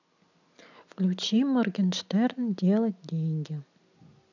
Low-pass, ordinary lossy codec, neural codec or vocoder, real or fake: 7.2 kHz; none; none; real